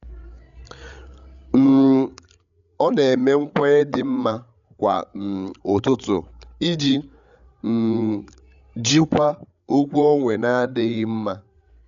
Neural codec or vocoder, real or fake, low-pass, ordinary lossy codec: codec, 16 kHz, 8 kbps, FreqCodec, larger model; fake; 7.2 kHz; MP3, 96 kbps